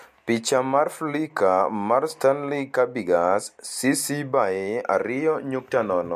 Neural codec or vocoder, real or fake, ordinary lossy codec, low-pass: none; real; MP3, 64 kbps; 14.4 kHz